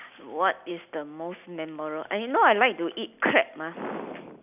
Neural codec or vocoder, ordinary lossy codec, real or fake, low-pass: none; none; real; 3.6 kHz